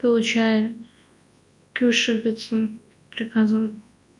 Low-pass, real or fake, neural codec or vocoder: 10.8 kHz; fake; codec, 24 kHz, 0.9 kbps, WavTokenizer, large speech release